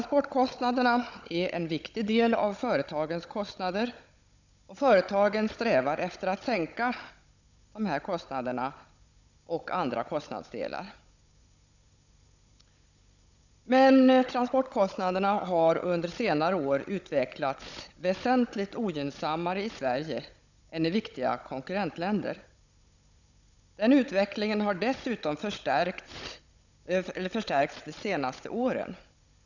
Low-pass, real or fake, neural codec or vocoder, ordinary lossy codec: 7.2 kHz; fake; codec, 16 kHz, 16 kbps, FunCodec, trained on Chinese and English, 50 frames a second; none